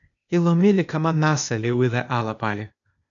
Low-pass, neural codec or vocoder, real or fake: 7.2 kHz; codec, 16 kHz, 0.8 kbps, ZipCodec; fake